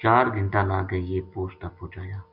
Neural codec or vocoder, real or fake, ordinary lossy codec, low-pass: none; real; Opus, 64 kbps; 5.4 kHz